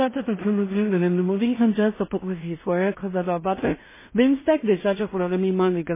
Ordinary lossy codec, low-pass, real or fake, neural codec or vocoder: MP3, 16 kbps; 3.6 kHz; fake; codec, 16 kHz in and 24 kHz out, 0.4 kbps, LongCat-Audio-Codec, two codebook decoder